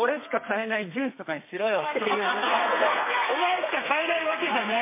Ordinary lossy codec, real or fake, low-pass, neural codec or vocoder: MP3, 24 kbps; fake; 3.6 kHz; codec, 32 kHz, 1.9 kbps, SNAC